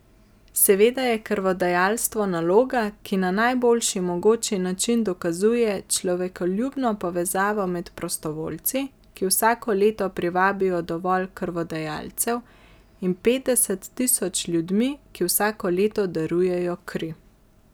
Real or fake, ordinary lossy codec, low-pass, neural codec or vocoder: real; none; none; none